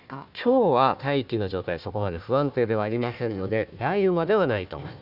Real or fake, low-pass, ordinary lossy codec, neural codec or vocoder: fake; 5.4 kHz; none; codec, 16 kHz, 1 kbps, FunCodec, trained on Chinese and English, 50 frames a second